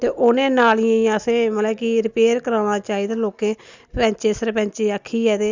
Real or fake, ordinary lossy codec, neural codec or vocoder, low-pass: real; Opus, 64 kbps; none; 7.2 kHz